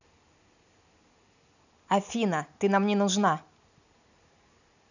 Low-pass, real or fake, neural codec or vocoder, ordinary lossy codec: 7.2 kHz; real; none; none